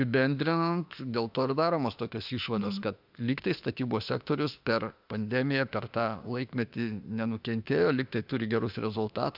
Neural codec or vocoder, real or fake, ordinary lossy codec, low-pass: autoencoder, 48 kHz, 32 numbers a frame, DAC-VAE, trained on Japanese speech; fake; AAC, 48 kbps; 5.4 kHz